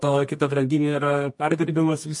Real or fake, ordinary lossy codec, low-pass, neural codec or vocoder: fake; MP3, 48 kbps; 10.8 kHz; codec, 24 kHz, 0.9 kbps, WavTokenizer, medium music audio release